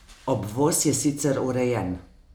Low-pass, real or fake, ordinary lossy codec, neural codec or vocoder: none; real; none; none